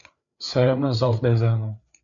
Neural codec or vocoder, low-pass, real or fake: codec, 16 kHz, 4 kbps, FreqCodec, larger model; 7.2 kHz; fake